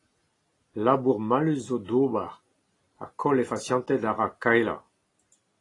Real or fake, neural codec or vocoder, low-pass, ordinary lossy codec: real; none; 10.8 kHz; AAC, 32 kbps